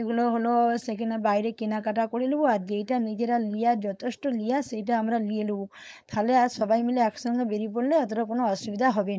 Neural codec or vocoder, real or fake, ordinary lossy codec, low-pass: codec, 16 kHz, 4.8 kbps, FACodec; fake; none; none